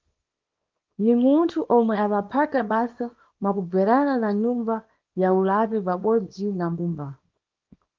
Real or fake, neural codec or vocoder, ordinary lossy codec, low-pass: fake; codec, 24 kHz, 0.9 kbps, WavTokenizer, small release; Opus, 32 kbps; 7.2 kHz